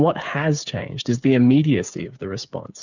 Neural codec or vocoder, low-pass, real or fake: codec, 16 kHz, 8 kbps, FreqCodec, smaller model; 7.2 kHz; fake